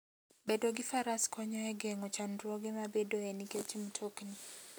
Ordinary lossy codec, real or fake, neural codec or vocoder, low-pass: none; real; none; none